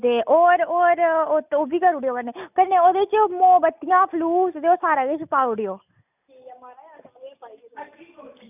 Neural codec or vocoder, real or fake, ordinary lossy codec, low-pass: none; real; none; 3.6 kHz